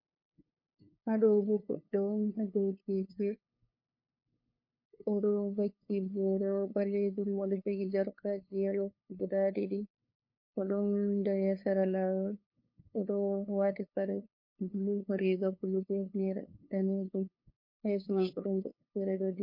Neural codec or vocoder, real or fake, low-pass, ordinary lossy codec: codec, 16 kHz, 2 kbps, FunCodec, trained on LibriTTS, 25 frames a second; fake; 5.4 kHz; MP3, 32 kbps